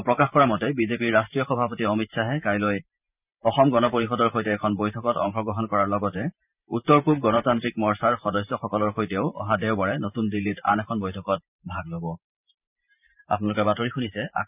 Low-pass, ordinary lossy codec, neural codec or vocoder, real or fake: 3.6 kHz; none; vocoder, 44.1 kHz, 128 mel bands every 512 samples, BigVGAN v2; fake